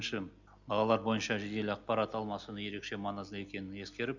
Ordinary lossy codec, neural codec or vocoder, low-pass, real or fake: none; none; 7.2 kHz; real